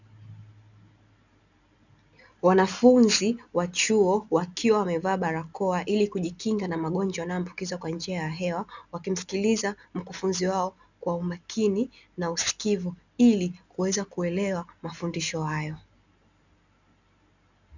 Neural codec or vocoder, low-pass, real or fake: none; 7.2 kHz; real